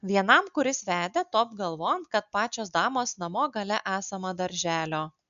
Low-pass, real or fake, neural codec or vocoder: 7.2 kHz; real; none